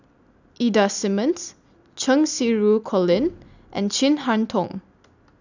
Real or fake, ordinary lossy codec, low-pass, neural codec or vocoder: real; none; 7.2 kHz; none